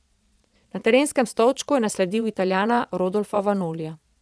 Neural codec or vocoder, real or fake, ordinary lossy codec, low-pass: vocoder, 22.05 kHz, 80 mel bands, Vocos; fake; none; none